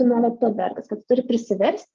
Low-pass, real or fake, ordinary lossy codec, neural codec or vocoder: 7.2 kHz; fake; Opus, 24 kbps; codec, 16 kHz, 6 kbps, DAC